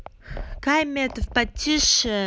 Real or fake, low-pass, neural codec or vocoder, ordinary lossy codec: real; none; none; none